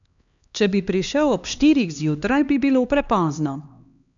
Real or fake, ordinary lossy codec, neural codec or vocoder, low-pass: fake; none; codec, 16 kHz, 2 kbps, X-Codec, HuBERT features, trained on LibriSpeech; 7.2 kHz